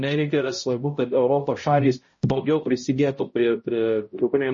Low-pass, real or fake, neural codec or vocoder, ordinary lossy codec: 7.2 kHz; fake; codec, 16 kHz, 0.5 kbps, X-Codec, HuBERT features, trained on balanced general audio; MP3, 32 kbps